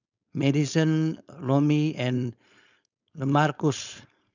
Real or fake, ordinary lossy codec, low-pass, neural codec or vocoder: fake; none; 7.2 kHz; codec, 16 kHz, 4.8 kbps, FACodec